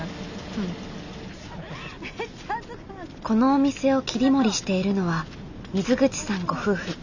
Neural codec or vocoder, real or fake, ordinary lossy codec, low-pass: none; real; none; 7.2 kHz